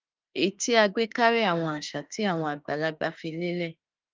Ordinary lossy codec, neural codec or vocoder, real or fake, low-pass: Opus, 24 kbps; autoencoder, 48 kHz, 32 numbers a frame, DAC-VAE, trained on Japanese speech; fake; 7.2 kHz